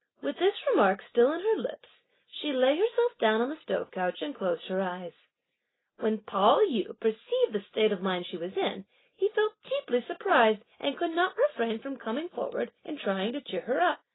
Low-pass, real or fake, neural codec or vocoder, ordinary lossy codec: 7.2 kHz; real; none; AAC, 16 kbps